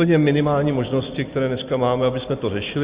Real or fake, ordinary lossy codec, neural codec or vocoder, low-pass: real; Opus, 64 kbps; none; 3.6 kHz